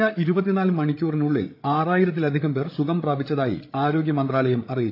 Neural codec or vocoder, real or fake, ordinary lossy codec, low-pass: codec, 16 kHz, 8 kbps, FreqCodec, larger model; fake; AAC, 32 kbps; 5.4 kHz